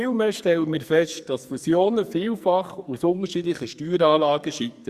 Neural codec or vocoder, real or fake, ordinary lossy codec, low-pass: codec, 44.1 kHz, 2.6 kbps, SNAC; fake; Opus, 64 kbps; 14.4 kHz